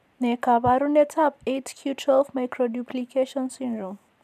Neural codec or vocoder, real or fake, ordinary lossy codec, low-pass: vocoder, 44.1 kHz, 128 mel bands every 512 samples, BigVGAN v2; fake; none; 14.4 kHz